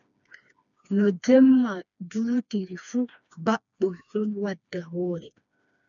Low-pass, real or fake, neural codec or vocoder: 7.2 kHz; fake; codec, 16 kHz, 2 kbps, FreqCodec, smaller model